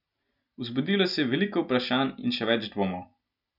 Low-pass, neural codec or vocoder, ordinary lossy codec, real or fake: 5.4 kHz; none; none; real